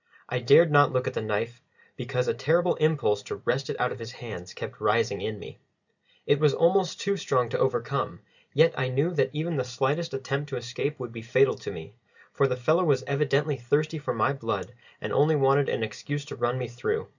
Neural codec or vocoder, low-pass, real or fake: none; 7.2 kHz; real